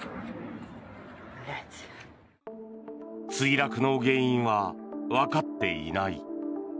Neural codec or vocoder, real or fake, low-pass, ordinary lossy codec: none; real; none; none